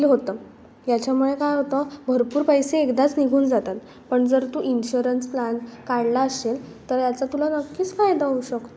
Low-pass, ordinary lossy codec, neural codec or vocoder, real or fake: none; none; none; real